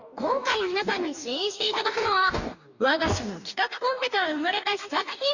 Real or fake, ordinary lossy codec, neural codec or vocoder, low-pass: fake; none; codec, 44.1 kHz, 2.6 kbps, DAC; 7.2 kHz